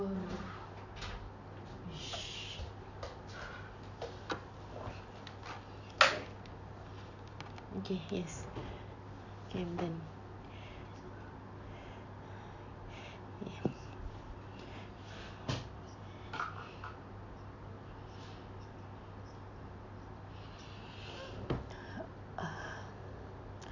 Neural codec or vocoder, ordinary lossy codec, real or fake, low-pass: none; none; real; 7.2 kHz